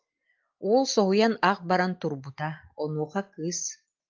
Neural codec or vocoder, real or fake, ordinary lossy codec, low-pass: none; real; Opus, 32 kbps; 7.2 kHz